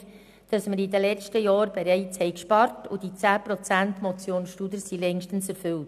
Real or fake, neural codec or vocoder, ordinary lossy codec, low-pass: real; none; none; 14.4 kHz